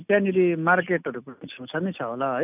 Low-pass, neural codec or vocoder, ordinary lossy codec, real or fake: 3.6 kHz; none; none; real